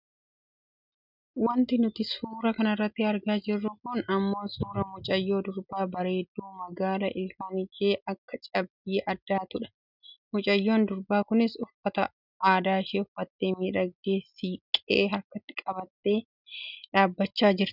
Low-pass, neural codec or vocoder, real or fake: 5.4 kHz; none; real